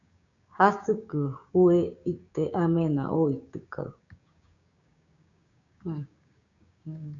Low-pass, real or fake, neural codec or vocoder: 7.2 kHz; fake; codec, 16 kHz, 6 kbps, DAC